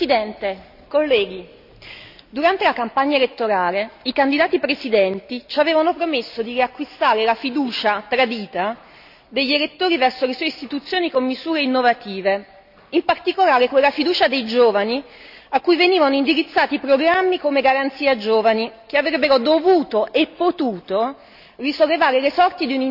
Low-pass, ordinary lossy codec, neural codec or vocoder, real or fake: 5.4 kHz; none; none; real